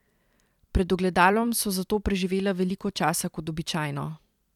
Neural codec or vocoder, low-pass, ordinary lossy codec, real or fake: vocoder, 44.1 kHz, 128 mel bands every 256 samples, BigVGAN v2; 19.8 kHz; none; fake